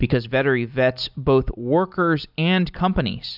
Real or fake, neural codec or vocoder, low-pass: real; none; 5.4 kHz